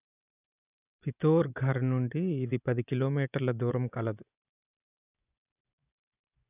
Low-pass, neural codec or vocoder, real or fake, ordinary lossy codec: 3.6 kHz; vocoder, 24 kHz, 100 mel bands, Vocos; fake; none